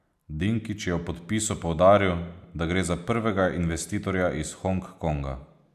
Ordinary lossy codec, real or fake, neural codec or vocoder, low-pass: none; real; none; 14.4 kHz